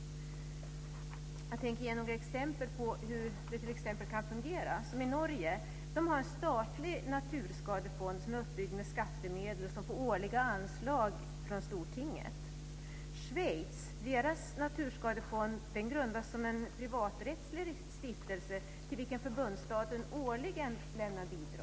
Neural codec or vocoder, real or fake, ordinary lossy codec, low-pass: none; real; none; none